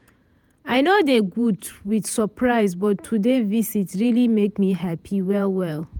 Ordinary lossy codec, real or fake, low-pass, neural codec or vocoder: none; fake; none; vocoder, 48 kHz, 128 mel bands, Vocos